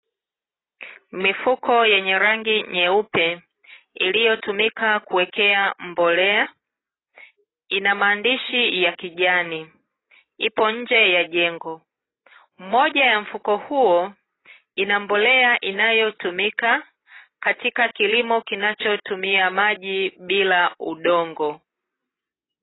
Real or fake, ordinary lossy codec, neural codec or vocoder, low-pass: real; AAC, 16 kbps; none; 7.2 kHz